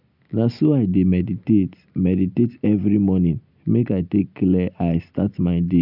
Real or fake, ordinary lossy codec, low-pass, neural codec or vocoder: real; none; 5.4 kHz; none